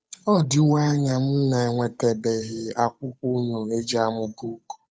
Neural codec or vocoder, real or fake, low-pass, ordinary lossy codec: codec, 16 kHz, 8 kbps, FunCodec, trained on Chinese and English, 25 frames a second; fake; none; none